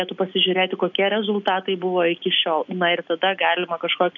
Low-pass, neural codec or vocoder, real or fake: 7.2 kHz; none; real